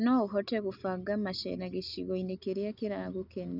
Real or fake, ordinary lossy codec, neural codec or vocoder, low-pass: real; none; none; 5.4 kHz